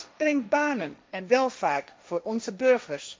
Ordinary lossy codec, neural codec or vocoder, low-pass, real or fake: none; codec, 16 kHz, 1.1 kbps, Voila-Tokenizer; none; fake